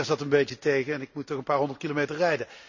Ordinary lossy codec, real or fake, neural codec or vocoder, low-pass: none; real; none; 7.2 kHz